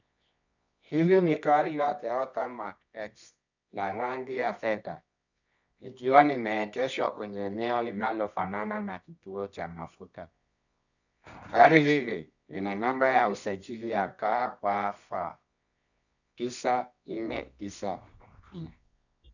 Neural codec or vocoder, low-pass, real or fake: codec, 24 kHz, 0.9 kbps, WavTokenizer, medium music audio release; 7.2 kHz; fake